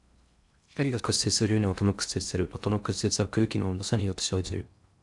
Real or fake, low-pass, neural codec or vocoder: fake; 10.8 kHz; codec, 16 kHz in and 24 kHz out, 0.6 kbps, FocalCodec, streaming, 4096 codes